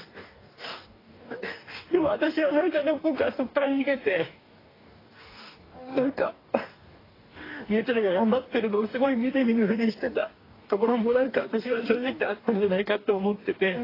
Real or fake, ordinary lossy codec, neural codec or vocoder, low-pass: fake; AAC, 24 kbps; codec, 44.1 kHz, 2.6 kbps, DAC; 5.4 kHz